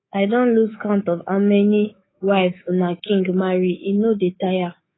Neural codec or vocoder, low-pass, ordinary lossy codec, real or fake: codec, 24 kHz, 3.1 kbps, DualCodec; 7.2 kHz; AAC, 16 kbps; fake